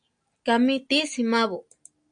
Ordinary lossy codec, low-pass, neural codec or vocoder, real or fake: AAC, 64 kbps; 9.9 kHz; none; real